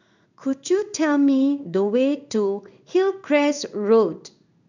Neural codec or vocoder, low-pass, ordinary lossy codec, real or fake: codec, 16 kHz in and 24 kHz out, 1 kbps, XY-Tokenizer; 7.2 kHz; none; fake